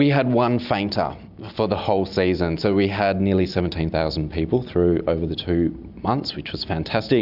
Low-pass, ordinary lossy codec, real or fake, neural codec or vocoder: 5.4 kHz; AAC, 48 kbps; real; none